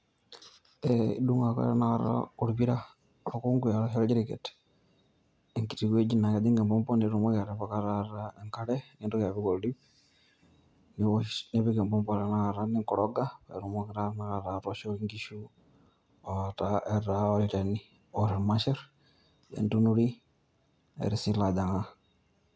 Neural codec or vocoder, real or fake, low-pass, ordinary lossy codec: none; real; none; none